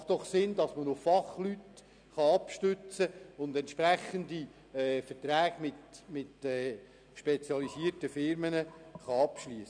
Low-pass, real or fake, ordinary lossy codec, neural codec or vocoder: 9.9 kHz; real; none; none